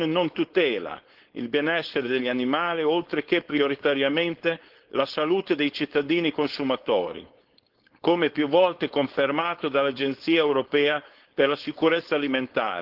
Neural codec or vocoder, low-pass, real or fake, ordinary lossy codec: codec, 16 kHz, 4.8 kbps, FACodec; 5.4 kHz; fake; Opus, 16 kbps